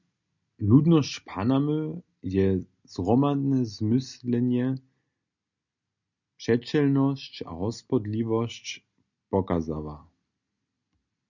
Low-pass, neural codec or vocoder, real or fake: 7.2 kHz; none; real